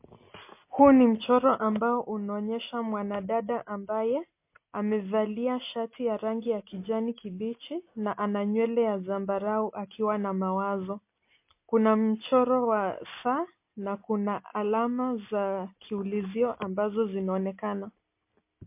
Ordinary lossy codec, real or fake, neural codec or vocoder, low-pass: MP3, 24 kbps; real; none; 3.6 kHz